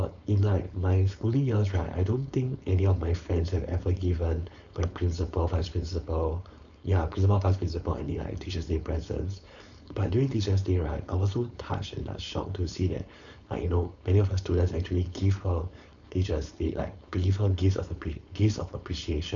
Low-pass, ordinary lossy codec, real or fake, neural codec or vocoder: 7.2 kHz; MP3, 64 kbps; fake; codec, 16 kHz, 4.8 kbps, FACodec